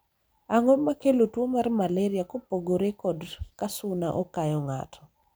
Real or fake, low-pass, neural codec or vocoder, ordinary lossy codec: fake; none; vocoder, 44.1 kHz, 128 mel bands every 256 samples, BigVGAN v2; none